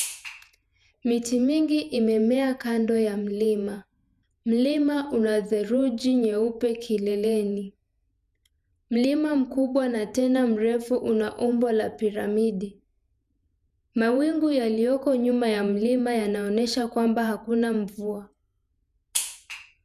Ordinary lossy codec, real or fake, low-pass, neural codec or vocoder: none; fake; 14.4 kHz; vocoder, 48 kHz, 128 mel bands, Vocos